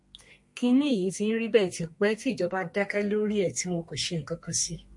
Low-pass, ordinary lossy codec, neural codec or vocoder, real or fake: 10.8 kHz; MP3, 48 kbps; codec, 32 kHz, 1.9 kbps, SNAC; fake